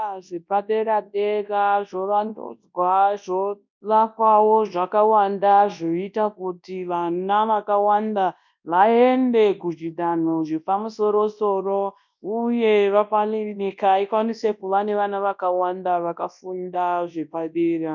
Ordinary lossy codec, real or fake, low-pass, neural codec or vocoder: MP3, 64 kbps; fake; 7.2 kHz; codec, 24 kHz, 0.9 kbps, WavTokenizer, large speech release